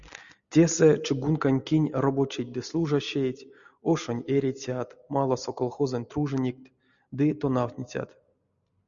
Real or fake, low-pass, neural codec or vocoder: real; 7.2 kHz; none